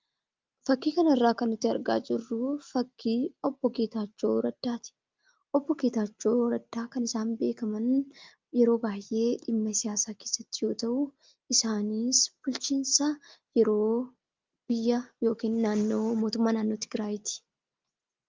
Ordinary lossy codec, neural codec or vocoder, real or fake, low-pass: Opus, 24 kbps; none; real; 7.2 kHz